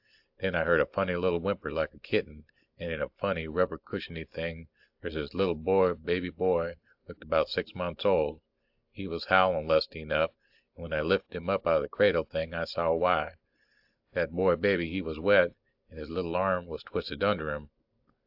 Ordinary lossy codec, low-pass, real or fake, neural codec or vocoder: AAC, 48 kbps; 5.4 kHz; real; none